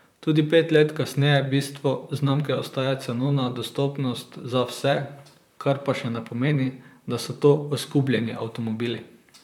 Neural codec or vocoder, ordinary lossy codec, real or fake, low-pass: vocoder, 44.1 kHz, 128 mel bands, Pupu-Vocoder; none; fake; 19.8 kHz